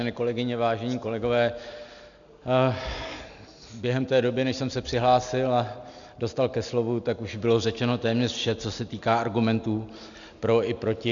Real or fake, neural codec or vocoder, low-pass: real; none; 7.2 kHz